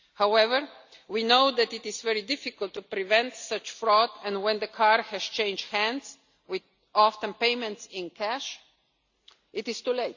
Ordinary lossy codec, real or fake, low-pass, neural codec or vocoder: Opus, 64 kbps; real; 7.2 kHz; none